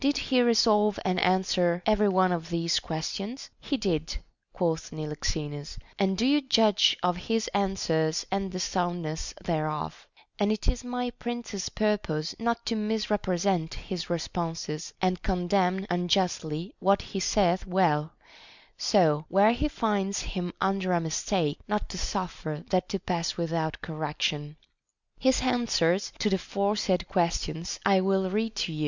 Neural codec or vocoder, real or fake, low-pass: none; real; 7.2 kHz